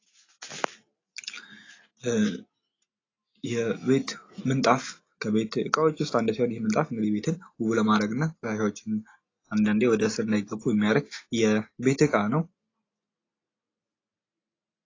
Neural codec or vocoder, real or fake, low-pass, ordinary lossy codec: none; real; 7.2 kHz; AAC, 32 kbps